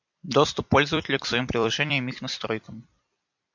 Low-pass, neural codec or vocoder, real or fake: 7.2 kHz; vocoder, 44.1 kHz, 128 mel bands every 256 samples, BigVGAN v2; fake